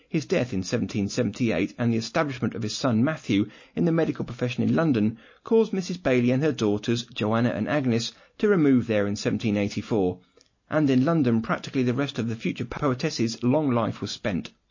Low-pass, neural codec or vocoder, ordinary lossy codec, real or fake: 7.2 kHz; none; MP3, 32 kbps; real